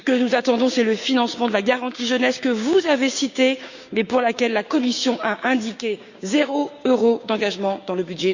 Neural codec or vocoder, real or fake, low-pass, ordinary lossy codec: codec, 16 kHz, 6 kbps, DAC; fake; 7.2 kHz; Opus, 64 kbps